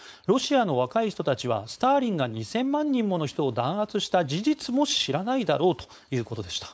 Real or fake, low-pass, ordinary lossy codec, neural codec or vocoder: fake; none; none; codec, 16 kHz, 4.8 kbps, FACodec